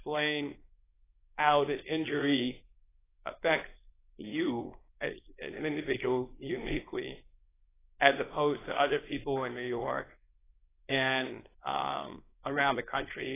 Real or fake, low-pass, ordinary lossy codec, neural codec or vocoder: fake; 3.6 kHz; AAC, 16 kbps; codec, 24 kHz, 0.9 kbps, WavTokenizer, small release